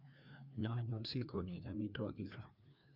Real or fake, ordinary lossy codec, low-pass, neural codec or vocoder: fake; none; 5.4 kHz; codec, 16 kHz, 2 kbps, FreqCodec, larger model